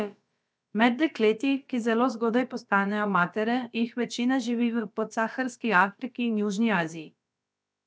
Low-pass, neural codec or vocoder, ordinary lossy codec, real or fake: none; codec, 16 kHz, about 1 kbps, DyCAST, with the encoder's durations; none; fake